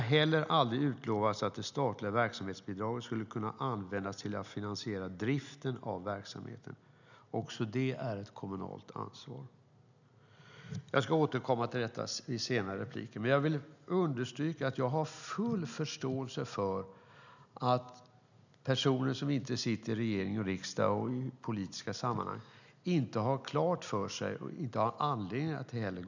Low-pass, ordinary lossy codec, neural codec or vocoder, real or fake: 7.2 kHz; none; none; real